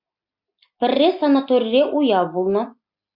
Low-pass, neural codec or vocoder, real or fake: 5.4 kHz; none; real